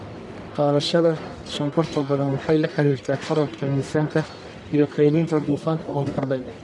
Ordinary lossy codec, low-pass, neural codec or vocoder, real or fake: none; 10.8 kHz; codec, 44.1 kHz, 1.7 kbps, Pupu-Codec; fake